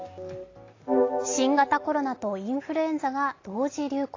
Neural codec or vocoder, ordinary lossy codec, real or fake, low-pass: none; AAC, 32 kbps; real; 7.2 kHz